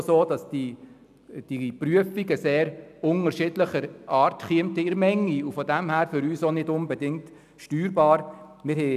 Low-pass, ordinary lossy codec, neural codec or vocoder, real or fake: 14.4 kHz; none; none; real